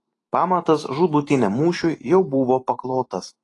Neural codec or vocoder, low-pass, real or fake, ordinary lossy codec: vocoder, 44.1 kHz, 128 mel bands every 512 samples, BigVGAN v2; 10.8 kHz; fake; AAC, 32 kbps